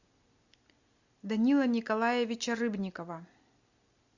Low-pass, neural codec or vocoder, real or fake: 7.2 kHz; none; real